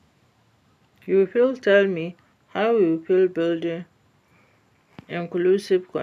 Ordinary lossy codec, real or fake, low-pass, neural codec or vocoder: none; real; 14.4 kHz; none